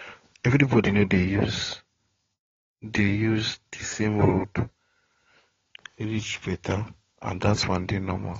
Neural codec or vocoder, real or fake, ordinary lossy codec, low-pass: codec, 16 kHz, 16 kbps, FunCodec, trained on LibriTTS, 50 frames a second; fake; AAC, 24 kbps; 7.2 kHz